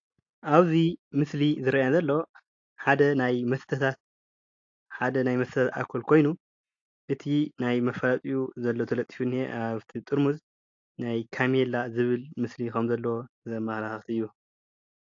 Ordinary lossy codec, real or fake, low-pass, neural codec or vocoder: AAC, 48 kbps; real; 7.2 kHz; none